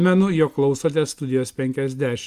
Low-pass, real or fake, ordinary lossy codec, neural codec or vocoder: 14.4 kHz; real; Opus, 32 kbps; none